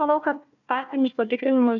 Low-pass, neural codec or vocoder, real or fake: 7.2 kHz; codec, 16 kHz, 1 kbps, FunCodec, trained on Chinese and English, 50 frames a second; fake